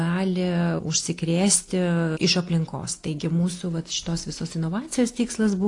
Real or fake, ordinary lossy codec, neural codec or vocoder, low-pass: real; AAC, 48 kbps; none; 10.8 kHz